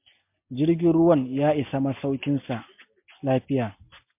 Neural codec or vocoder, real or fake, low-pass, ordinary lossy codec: none; real; 3.6 kHz; MP3, 32 kbps